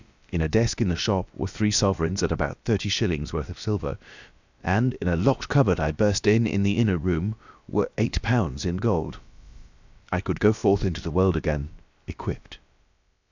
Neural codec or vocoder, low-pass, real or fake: codec, 16 kHz, about 1 kbps, DyCAST, with the encoder's durations; 7.2 kHz; fake